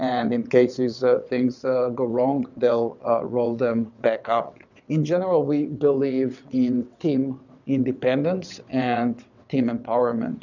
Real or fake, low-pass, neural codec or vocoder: fake; 7.2 kHz; vocoder, 22.05 kHz, 80 mel bands, WaveNeXt